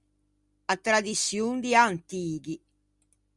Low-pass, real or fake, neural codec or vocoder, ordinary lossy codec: 10.8 kHz; real; none; Opus, 64 kbps